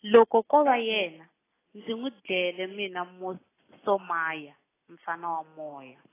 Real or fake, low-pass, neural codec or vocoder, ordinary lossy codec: real; 3.6 kHz; none; AAC, 16 kbps